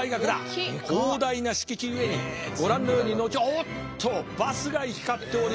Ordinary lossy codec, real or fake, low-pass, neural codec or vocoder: none; real; none; none